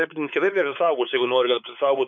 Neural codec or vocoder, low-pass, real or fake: codec, 16 kHz, 4 kbps, X-Codec, WavLM features, trained on Multilingual LibriSpeech; 7.2 kHz; fake